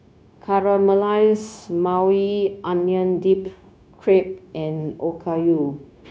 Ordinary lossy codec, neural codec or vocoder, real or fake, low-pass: none; codec, 16 kHz, 0.9 kbps, LongCat-Audio-Codec; fake; none